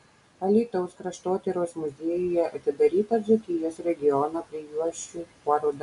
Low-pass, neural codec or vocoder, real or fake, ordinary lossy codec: 10.8 kHz; none; real; MP3, 64 kbps